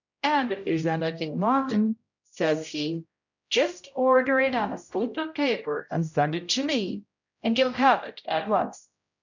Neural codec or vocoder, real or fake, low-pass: codec, 16 kHz, 0.5 kbps, X-Codec, HuBERT features, trained on general audio; fake; 7.2 kHz